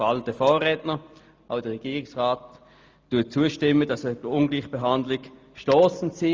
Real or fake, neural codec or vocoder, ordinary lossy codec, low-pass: real; none; Opus, 24 kbps; 7.2 kHz